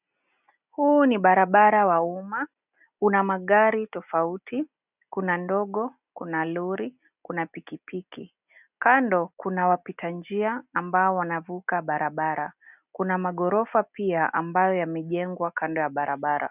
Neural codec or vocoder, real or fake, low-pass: none; real; 3.6 kHz